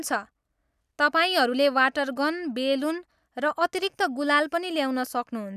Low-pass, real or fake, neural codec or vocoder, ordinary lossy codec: 14.4 kHz; real; none; none